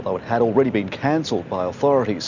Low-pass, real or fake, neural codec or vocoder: 7.2 kHz; real; none